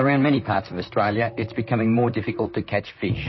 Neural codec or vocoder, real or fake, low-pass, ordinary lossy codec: vocoder, 44.1 kHz, 128 mel bands, Pupu-Vocoder; fake; 7.2 kHz; MP3, 24 kbps